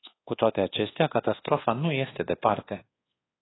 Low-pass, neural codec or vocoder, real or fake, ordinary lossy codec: 7.2 kHz; codec, 24 kHz, 1.2 kbps, DualCodec; fake; AAC, 16 kbps